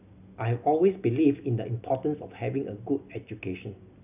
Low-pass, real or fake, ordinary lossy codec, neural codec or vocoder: 3.6 kHz; real; none; none